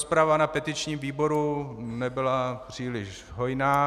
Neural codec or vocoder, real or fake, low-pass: none; real; 14.4 kHz